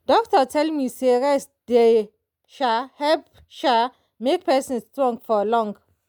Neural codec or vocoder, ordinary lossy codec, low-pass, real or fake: none; none; 19.8 kHz; real